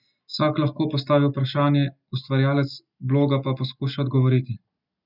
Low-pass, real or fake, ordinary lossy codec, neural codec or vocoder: 5.4 kHz; real; none; none